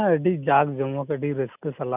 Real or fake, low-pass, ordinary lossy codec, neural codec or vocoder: real; 3.6 kHz; none; none